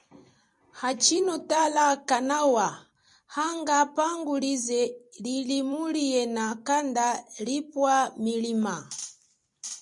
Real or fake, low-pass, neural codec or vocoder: fake; 10.8 kHz; vocoder, 44.1 kHz, 128 mel bands every 256 samples, BigVGAN v2